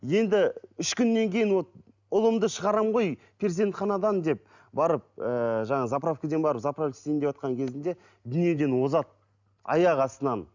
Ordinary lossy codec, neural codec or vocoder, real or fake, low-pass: none; none; real; 7.2 kHz